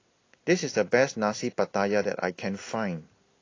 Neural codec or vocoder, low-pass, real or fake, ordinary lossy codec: none; 7.2 kHz; real; AAC, 32 kbps